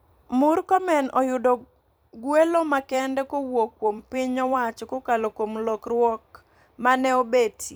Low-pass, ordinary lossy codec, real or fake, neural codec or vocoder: none; none; fake; vocoder, 44.1 kHz, 128 mel bands every 256 samples, BigVGAN v2